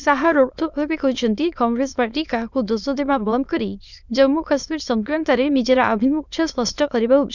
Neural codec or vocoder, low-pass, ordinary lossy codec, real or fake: autoencoder, 22.05 kHz, a latent of 192 numbers a frame, VITS, trained on many speakers; 7.2 kHz; none; fake